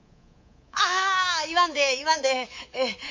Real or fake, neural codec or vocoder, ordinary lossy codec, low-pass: fake; codec, 24 kHz, 3.1 kbps, DualCodec; MP3, 48 kbps; 7.2 kHz